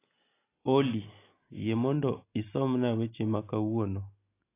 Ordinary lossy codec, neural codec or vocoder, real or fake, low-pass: AAC, 24 kbps; none; real; 3.6 kHz